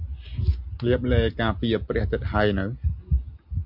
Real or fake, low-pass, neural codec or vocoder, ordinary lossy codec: real; 5.4 kHz; none; MP3, 48 kbps